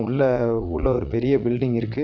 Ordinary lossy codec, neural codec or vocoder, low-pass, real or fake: none; vocoder, 22.05 kHz, 80 mel bands, Vocos; 7.2 kHz; fake